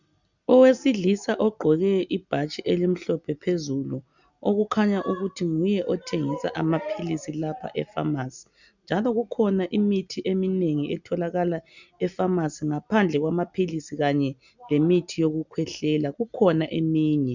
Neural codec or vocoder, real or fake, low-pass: none; real; 7.2 kHz